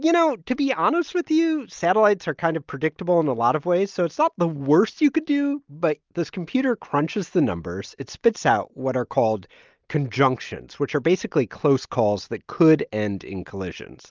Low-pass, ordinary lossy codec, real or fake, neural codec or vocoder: 7.2 kHz; Opus, 24 kbps; real; none